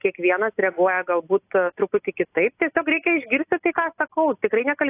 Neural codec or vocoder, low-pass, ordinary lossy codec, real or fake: none; 3.6 kHz; AAC, 24 kbps; real